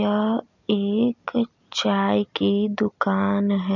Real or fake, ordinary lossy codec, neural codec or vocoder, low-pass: real; none; none; 7.2 kHz